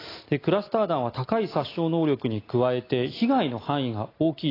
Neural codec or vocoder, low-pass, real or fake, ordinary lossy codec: none; 5.4 kHz; real; AAC, 24 kbps